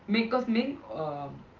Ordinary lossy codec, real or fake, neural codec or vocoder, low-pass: Opus, 32 kbps; real; none; 7.2 kHz